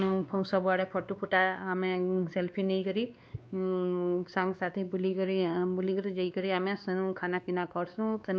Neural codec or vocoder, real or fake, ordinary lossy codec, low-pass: codec, 16 kHz, 2 kbps, X-Codec, WavLM features, trained on Multilingual LibriSpeech; fake; none; none